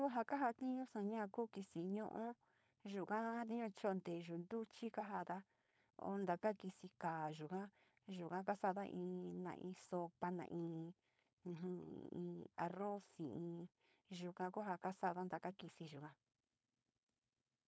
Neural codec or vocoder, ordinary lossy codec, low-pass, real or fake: codec, 16 kHz, 4.8 kbps, FACodec; none; none; fake